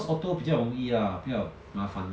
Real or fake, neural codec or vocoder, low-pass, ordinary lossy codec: real; none; none; none